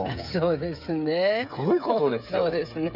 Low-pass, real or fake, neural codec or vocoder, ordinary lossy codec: 5.4 kHz; fake; codec, 16 kHz, 8 kbps, FreqCodec, smaller model; none